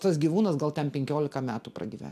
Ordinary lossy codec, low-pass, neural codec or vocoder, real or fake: AAC, 96 kbps; 14.4 kHz; none; real